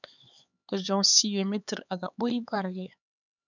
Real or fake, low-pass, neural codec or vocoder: fake; 7.2 kHz; codec, 16 kHz, 4 kbps, X-Codec, HuBERT features, trained on LibriSpeech